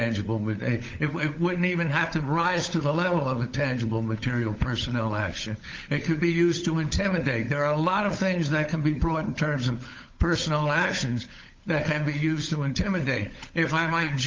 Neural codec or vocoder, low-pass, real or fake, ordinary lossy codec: codec, 16 kHz, 16 kbps, FunCodec, trained on LibriTTS, 50 frames a second; 7.2 kHz; fake; Opus, 32 kbps